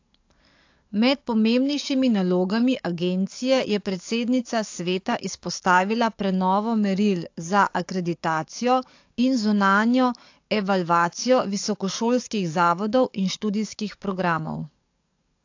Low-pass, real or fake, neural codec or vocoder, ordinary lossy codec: 7.2 kHz; fake; codec, 44.1 kHz, 7.8 kbps, DAC; AAC, 48 kbps